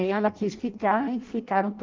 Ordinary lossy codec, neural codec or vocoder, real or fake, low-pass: Opus, 32 kbps; codec, 16 kHz in and 24 kHz out, 0.6 kbps, FireRedTTS-2 codec; fake; 7.2 kHz